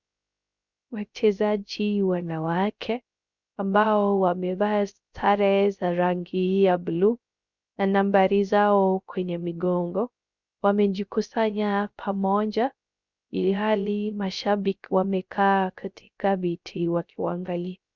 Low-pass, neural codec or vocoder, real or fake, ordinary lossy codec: 7.2 kHz; codec, 16 kHz, 0.3 kbps, FocalCodec; fake; Opus, 64 kbps